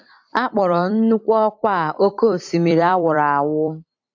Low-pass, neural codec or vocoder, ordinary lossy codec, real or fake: 7.2 kHz; vocoder, 44.1 kHz, 128 mel bands every 256 samples, BigVGAN v2; AAC, 48 kbps; fake